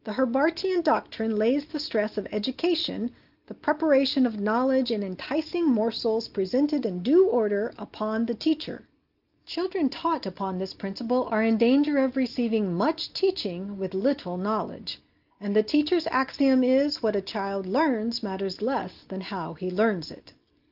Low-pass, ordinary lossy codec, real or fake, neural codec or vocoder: 5.4 kHz; Opus, 32 kbps; real; none